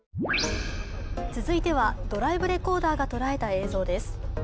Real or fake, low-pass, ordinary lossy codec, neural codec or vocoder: real; none; none; none